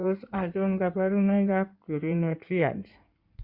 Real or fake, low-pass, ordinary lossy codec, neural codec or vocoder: fake; 5.4 kHz; Opus, 64 kbps; codec, 16 kHz in and 24 kHz out, 2.2 kbps, FireRedTTS-2 codec